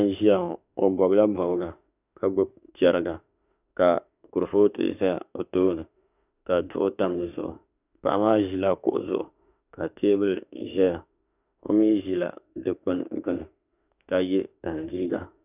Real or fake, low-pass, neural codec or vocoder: fake; 3.6 kHz; autoencoder, 48 kHz, 32 numbers a frame, DAC-VAE, trained on Japanese speech